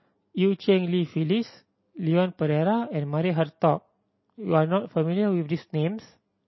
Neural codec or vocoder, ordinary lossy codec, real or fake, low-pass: none; MP3, 24 kbps; real; 7.2 kHz